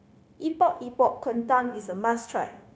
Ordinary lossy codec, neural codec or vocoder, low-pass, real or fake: none; codec, 16 kHz, 0.9 kbps, LongCat-Audio-Codec; none; fake